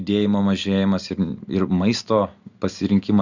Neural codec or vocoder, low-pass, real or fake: none; 7.2 kHz; real